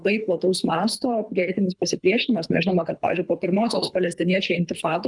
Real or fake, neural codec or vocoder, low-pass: fake; codec, 24 kHz, 3 kbps, HILCodec; 10.8 kHz